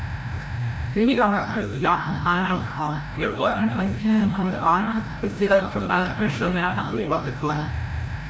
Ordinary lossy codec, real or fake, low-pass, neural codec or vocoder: none; fake; none; codec, 16 kHz, 0.5 kbps, FreqCodec, larger model